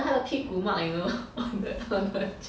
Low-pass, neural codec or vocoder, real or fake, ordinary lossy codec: none; none; real; none